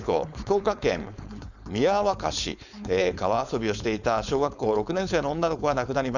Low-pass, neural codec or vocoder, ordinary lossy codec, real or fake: 7.2 kHz; codec, 16 kHz, 4.8 kbps, FACodec; none; fake